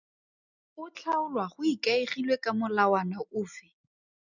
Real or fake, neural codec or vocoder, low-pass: real; none; 7.2 kHz